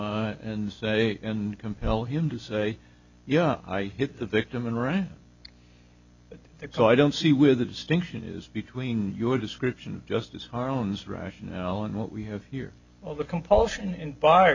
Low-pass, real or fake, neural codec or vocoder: 7.2 kHz; real; none